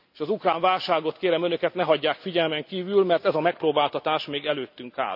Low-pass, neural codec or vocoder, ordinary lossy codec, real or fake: 5.4 kHz; none; none; real